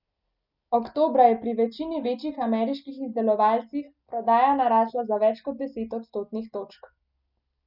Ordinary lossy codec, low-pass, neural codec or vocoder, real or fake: none; 5.4 kHz; none; real